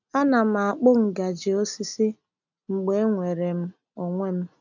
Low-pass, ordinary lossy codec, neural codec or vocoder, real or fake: 7.2 kHz; none; none; real